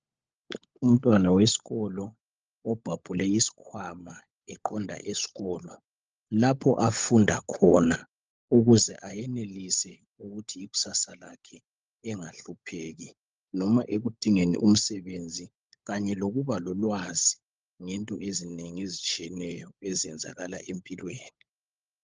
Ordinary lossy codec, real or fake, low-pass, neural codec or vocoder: Opus, 24 kbps; fake; 7.2 kHz; codec, 16 kHz, 16 kbps, FunCodec, trained on LibriTTS, 50 frames a second